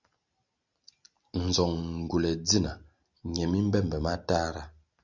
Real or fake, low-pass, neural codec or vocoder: real; 7.2 kHz; none